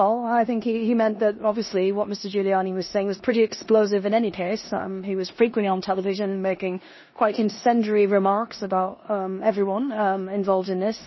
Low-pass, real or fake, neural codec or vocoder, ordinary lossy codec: 7.2 kHz; fake; codec, 16 kHz in and 24 kHz out, 0.9 kbps, LongCat-Audio-Codec, four codebook decoder; MP3, 24 kbps